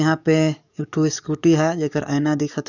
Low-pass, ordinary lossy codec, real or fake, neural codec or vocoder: 7.2 kHz; none; fake; codec, 44.1 kHz, 7.8 kbps, DAC